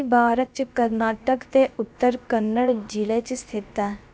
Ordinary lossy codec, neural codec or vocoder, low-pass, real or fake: none; codec, 16 kHz, about 1 kbps, DyCAST, with the encoder's durations; none; fake